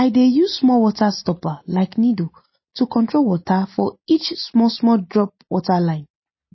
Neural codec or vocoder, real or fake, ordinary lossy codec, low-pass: none; real; MP3, 24 kbps; 7.2 kHz